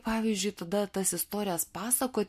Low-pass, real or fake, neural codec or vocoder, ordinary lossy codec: 14.4 kHz; real; none; MP3, 64 kbps